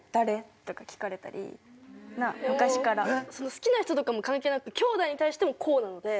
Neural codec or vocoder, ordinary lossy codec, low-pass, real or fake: none; none; none; real